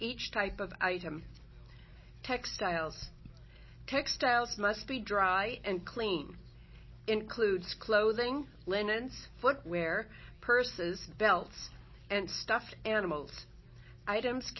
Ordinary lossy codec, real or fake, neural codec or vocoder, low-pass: MP3, 24 kbps; real; none; 7.2 kHz